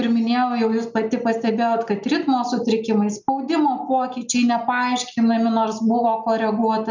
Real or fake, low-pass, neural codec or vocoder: real; 7.2 kHz; none